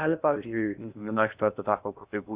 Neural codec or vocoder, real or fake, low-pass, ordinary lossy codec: codec, 16 kHz in and 24 kHz out, 0.6 kbps, FocalCodec, streaming, 2048 codes; fake; 3.6 kHz; Opus, 64 kbps